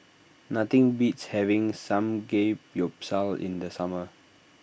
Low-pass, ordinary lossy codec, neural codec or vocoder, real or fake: none; none; none; real